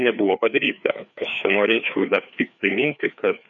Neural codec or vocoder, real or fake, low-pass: codec, 16 kHz, 2 kbps, FreqCodec, larger model; fake; 7.2 kHz